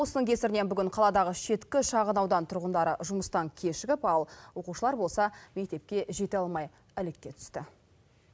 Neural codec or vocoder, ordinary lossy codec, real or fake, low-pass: none; none; real; none